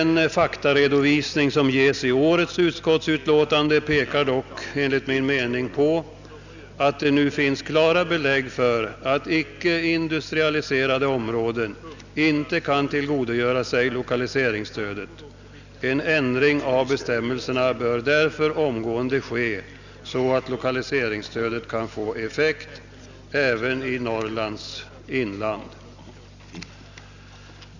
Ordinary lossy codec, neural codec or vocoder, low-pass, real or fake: none; none; 7.2 kHz; real